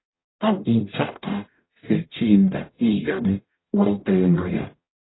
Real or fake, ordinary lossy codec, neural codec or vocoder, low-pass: fake; AAC, 16 kbps; codec, 44.1 kHz, 0.9 kbps, DAC; 7.2 kHz